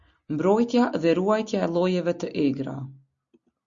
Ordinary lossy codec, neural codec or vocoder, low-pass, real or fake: Opus, 64 kbps; none; 7.2 kHz; real